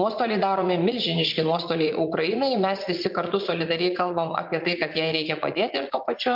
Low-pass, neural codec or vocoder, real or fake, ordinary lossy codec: 5.4 kHz; vocoder, 44.1 kHz, 80 mel bands, Vocos; fake; MP3, 48 kbps